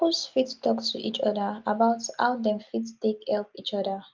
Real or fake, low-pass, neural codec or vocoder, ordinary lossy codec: real; 7.2 kHz; none; Opus, 24 kbps